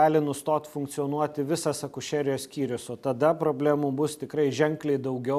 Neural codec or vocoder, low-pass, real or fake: none; 14.4 kHz; real